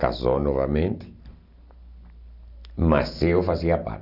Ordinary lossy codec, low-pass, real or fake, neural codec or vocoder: none; 5.4 kHz; real; none